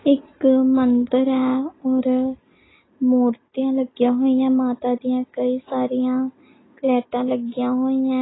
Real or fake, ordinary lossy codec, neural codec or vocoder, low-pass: real; AAC, 16 kbps; none; 7.2 kHz